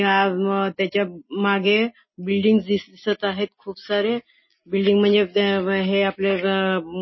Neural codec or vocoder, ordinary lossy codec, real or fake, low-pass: none; MP3, 24 kbps; real; 7.2 kHz